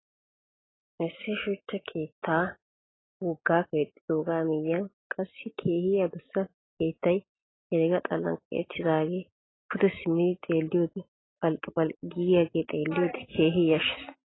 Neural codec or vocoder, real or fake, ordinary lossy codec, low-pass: none; real; AAC, 16 kbps; 7.2 kHz